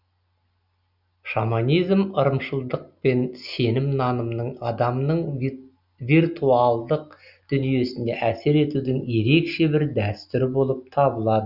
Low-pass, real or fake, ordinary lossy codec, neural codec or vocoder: 5.4 kHz; real; none; none